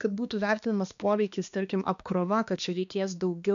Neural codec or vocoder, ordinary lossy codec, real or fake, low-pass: codec, 16 kHz, 2 kbps, X-Codec, HuBERT features, trained on balanced general audio; AAC, 96 kbps; fake; 7.2 kHz